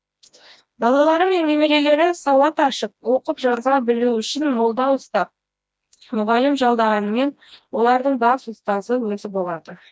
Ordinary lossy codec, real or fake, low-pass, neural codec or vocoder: none; fake; none; codec, 16 kHz, 1 kbps, FreqCodec, smaller model